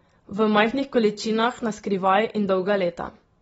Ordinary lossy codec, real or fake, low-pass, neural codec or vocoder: AAC, 24 kbps; real; 19.8 kHz; none